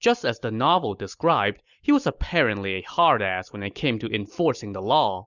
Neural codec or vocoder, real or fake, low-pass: none; real; 7.2 kHz